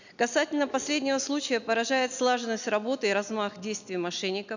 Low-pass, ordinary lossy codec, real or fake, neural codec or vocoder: 7.2 kHz; none; real; none